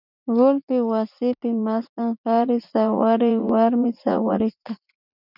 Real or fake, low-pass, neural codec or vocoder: fake; 5.4 kHz; vocoder, 44.1 kHz, 80 mel bands, Vocos